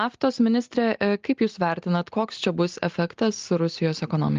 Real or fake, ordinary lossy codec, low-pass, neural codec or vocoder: real; Opus, 32 kbps; 7.2 kHz; none